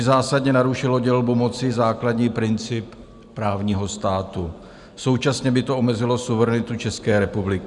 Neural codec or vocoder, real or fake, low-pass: none; real; 10.8 kHz